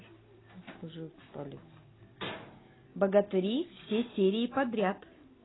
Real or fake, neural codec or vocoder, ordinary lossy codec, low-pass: real; none; AAC, 16 kbps; 7.2 kHz